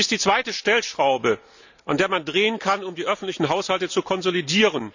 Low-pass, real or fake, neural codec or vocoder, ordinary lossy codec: 7.2 kHz; real; none; none